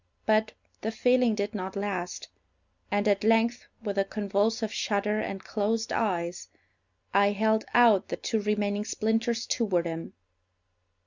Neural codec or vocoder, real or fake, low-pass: none; real; 7.2 kHz